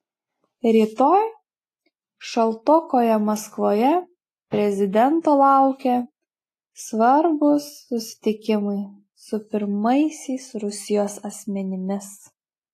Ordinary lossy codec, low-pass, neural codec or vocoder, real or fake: AAC, 48 kbps; 14.4 kHz; none; real